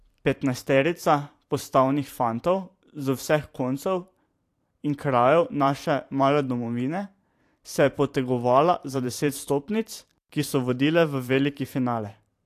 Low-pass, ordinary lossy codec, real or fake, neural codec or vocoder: 14.4 kHz; AAC, 64 kbps; fake; vocoder, 44.1 kHz, 128 mel bands every 512 samples, BigVGAN v2